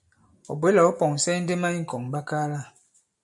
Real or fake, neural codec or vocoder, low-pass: real; none; 10.8 kHz